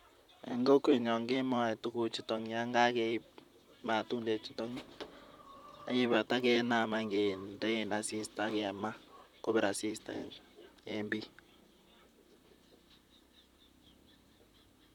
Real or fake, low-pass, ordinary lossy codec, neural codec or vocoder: fake; 19.8 kHz; none; vocoder, 44.1 kHz, 128 mel bands, Pupu-Vocoder